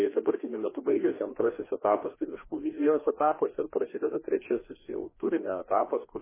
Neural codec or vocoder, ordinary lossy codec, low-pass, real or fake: codec, 16 kHz, 2 kbps, FreqCodec, larger model; MP3, 16 kbps; 3.6 kHz; fake